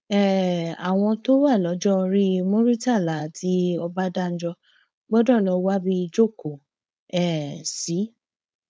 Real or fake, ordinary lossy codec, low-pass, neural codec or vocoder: fake; none; none; codec, 16 kHz, 4.8 kbps, FACodec